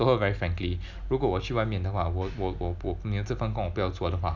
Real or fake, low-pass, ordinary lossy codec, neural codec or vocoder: real; 7.2 kHz; none; none